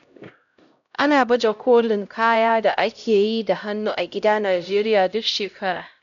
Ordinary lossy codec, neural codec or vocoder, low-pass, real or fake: none; codec, 16 kHz, 0.5 kbps, X-Codec, HuBERT features, trained on LibriSpeech; 7.2 kHz; fake